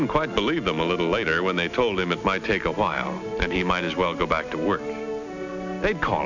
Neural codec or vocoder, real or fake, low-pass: none; real; 7.2 kHz